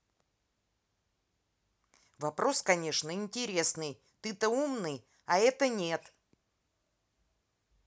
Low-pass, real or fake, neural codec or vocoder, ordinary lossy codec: none; real; none; none